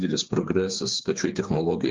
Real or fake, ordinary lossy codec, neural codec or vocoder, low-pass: fake; Opus, 32 kbps; codec, 16 kHz, 4 kbps, FreqCodec, smaller model; 7.2 kHz